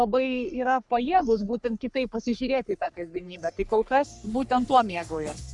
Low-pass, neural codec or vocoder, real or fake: 10.8 kHz; codec, 44.1 kHz, 3.4 kbps, Pupu-Codec; fake